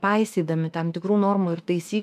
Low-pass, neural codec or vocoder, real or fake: 14.4 kHz; autoencoder, 48 kHz, 32 numbers a frame, DAC-VAE, trained on Japanese speech; fake